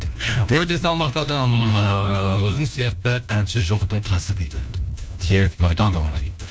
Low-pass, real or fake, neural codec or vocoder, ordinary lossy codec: none; fake; codec, 16 kHz, 1 kbps, FunCodec, trained on LibriTTS, 50 frames a second; none